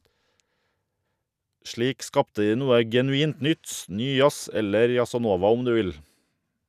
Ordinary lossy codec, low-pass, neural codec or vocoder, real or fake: none; 14.4 kHz; none; real